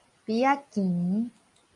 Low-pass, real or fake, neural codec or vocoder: 10.8 kHz; real; none